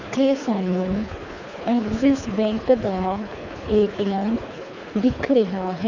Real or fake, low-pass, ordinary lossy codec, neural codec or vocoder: fake; 7.2 kHz; none; codec, 24 kHz, 3 kbps, HILCodec